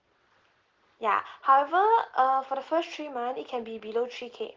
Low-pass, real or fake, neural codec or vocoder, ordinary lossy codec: 7.2 kHz; real; none; Opus, 16 kbps